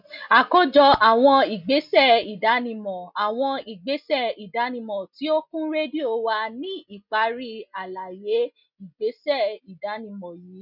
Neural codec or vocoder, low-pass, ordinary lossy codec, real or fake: none; 5.4 kHz; none; real